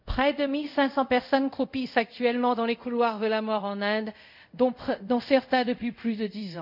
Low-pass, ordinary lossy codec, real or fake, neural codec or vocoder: 5.4 kHz; none; fake; codec, 24 kHz, 0.5 kbps, DualCodec